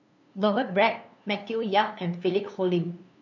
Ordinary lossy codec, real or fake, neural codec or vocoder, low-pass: none; fake; codec, 16 kHz, 2 kbps, FunCodec, trained on LibriTTS, 25 frames a second; 7.2 kHz